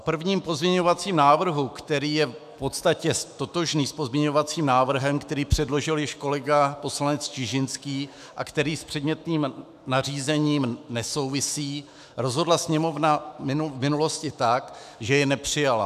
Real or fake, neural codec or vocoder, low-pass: fake; autoencoder, 48 kHz, 128 numbers a frame, DAC-VAE, trained on Japanese speech; 14.4 kHz